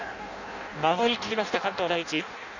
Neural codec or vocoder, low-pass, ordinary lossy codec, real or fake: codec, 16 kHz in and 24 kHz out, 0.6 kbps, FireRedTTS-2 codec; 7.2 kHz; none; fake